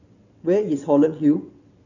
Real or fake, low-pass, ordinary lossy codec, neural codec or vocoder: fake; 7.2 kHz; none; vocoder, 22.05 kHz, 80 mel bands, WaveNeXt